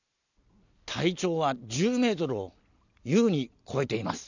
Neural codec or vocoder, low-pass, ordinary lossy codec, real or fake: none; 7.2 kHz; none; real